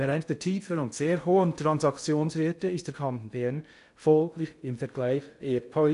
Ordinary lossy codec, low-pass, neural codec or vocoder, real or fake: none; 10.8 kHz; codec, 16 kHz in and 24 kHz out, 0.6 kbps, FocalCodec, streaming, 2048 codes; fake